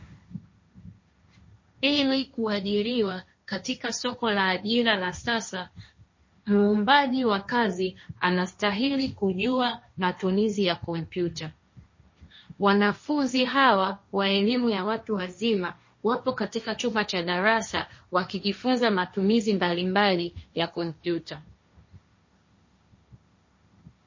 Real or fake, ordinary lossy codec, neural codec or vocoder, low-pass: fake; MP3, 32 kbps; codec, 16 kHz, 1.1 kbps, Voila-Tokenizer; 7.2 kHz